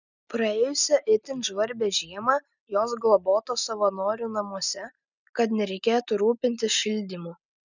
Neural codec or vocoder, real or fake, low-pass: none; real; 7.2 kHz